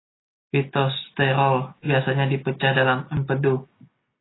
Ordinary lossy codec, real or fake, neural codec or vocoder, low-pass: AAC, 16 kbps; real; none; 7.2 kHz